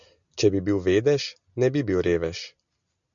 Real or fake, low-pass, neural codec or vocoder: real; 7.2 kHz; none